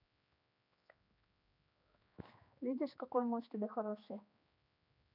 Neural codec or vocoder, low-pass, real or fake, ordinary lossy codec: codec, 16 kHz, 2 kbps, X-Codec, HuBERT features, trained on general audio; 5.4 kHz; fake; none